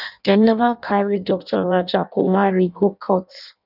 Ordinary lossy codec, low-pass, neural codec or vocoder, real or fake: none; 5.4 kHz; codec, 16 kHz in and 24 kHz out, 0.6 kbps, FireRedTTS-2 codec; fake